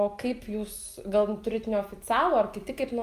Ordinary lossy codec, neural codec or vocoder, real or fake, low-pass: Opus, 32 kbps; none; real; 14.4 kHz